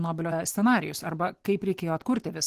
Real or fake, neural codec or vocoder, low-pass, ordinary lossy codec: real; none; 14.4 kHz; Opus, 16 kbps